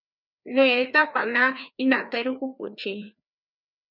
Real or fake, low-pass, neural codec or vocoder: fake; 5.4 kHz; codec, 16 kHz, 2 kbps, FreqCodec, larger model